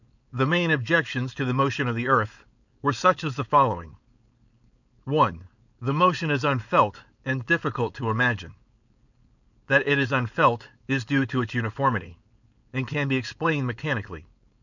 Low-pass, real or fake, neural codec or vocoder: 7.2 kHz; fake; codec, 16 kHz, 4.8 kbps, FACodec